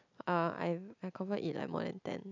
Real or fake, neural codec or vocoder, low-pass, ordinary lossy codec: real; none; 7.2 kHz; none